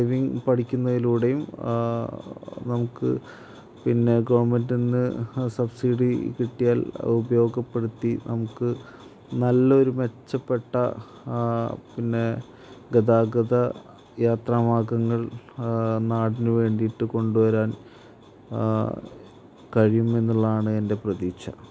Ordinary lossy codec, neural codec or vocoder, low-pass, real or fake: none; none; none; real